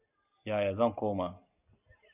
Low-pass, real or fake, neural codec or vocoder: 3.6 kHz; real; none